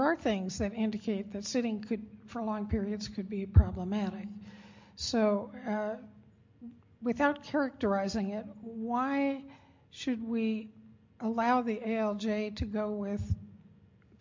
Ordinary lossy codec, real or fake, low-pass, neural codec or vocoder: MP3, 48 kbps; real; 7.2 kHz; none